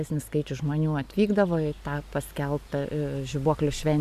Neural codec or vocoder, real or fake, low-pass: none; real; 14.4 kHz